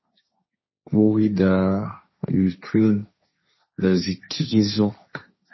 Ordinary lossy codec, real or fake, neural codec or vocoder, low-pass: MP3, 24 kbps; fake; codec, 16 kHz, 1.1 kbps, Voila-Tokenizer; 7.2 kHz